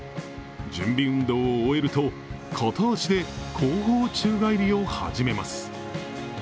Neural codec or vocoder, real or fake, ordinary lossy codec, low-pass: none; real; none; none